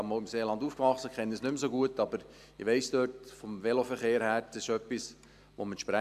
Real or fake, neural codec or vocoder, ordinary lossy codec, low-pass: real; none; AAC, 96 kbps; 14.4 kHz